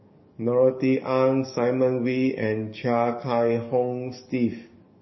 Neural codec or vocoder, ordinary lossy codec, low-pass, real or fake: codec, 44.1 kHz, 7.8 kbps, DAC; MP3, 24 kbps; 7.2 kHz; fake